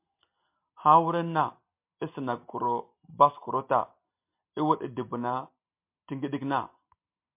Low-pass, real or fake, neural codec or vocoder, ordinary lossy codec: 3.6 kHz; real; none; MP3, 32 kbps